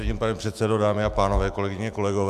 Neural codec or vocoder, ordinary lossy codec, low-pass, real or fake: autoencoder, 48 kHz, 128 numbers a frame, DAC-VAE, trained on Japanese speech; Opus, 64 kbps; 14.4 kHz; fake